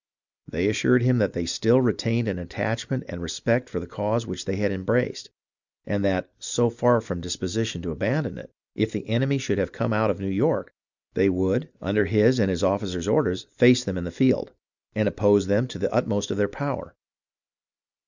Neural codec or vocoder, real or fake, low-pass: none; real; 7.2 kHz